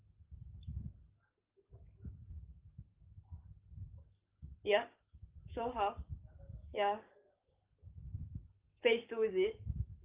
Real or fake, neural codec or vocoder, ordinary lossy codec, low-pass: fake; codec, 44.1 kHz, 7.8 kbps, Pupu-Codec; Opus, 32 kbps; 3.6 kHz